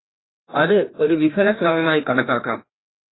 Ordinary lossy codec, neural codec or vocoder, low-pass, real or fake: AAC, 16 kbps; codec, 16 kHz, 1 kbps, FreqCodec, larger model; 7.2 kHz; fake